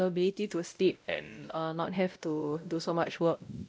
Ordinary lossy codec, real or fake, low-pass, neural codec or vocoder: none; fake; none; codec, 16 kHz, 0.5 kbps, X-Codec, WavLM features, trained on Multilingual LibriSpeech